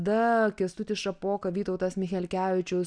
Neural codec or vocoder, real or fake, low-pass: none; real; 9.9 kHz